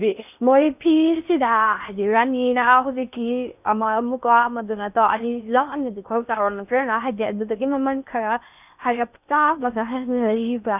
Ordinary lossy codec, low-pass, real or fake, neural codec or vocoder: none; 3.6 kHz; fake; codec, 16 kHz in and 24 kHz out, 0.6 kbps, FocalCodec, streaming, 4096 codes